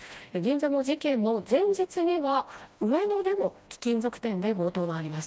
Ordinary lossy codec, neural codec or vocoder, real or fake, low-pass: none; codec, 16 kHz, 1 kbps, FreqCodec, smaller model; fake; none